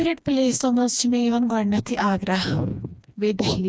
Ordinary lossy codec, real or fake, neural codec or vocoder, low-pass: none; fake; codec, 16 kHz, 2 kbps, FreqCodec, smaller model; none